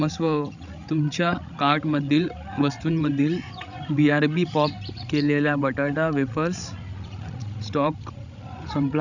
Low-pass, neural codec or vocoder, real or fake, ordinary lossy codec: 7.2 kHz; codec, 16 kHz, 16 kbps, FreqCodec, larger model; fake; none